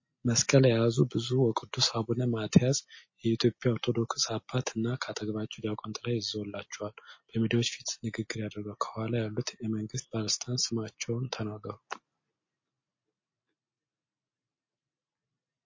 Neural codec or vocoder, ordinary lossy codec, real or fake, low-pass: none; MP3, 32 kbps; real; 7.2 kHz